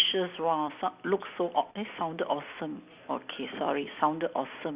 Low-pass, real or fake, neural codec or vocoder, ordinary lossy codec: 3.6 kHz; real; none; Opus, 64 kbps